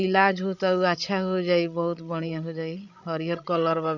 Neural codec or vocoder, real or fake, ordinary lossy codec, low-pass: codec, 16 kHz, 16 kbps, FreqCodec, larger model; fake; none; 7.2 kHz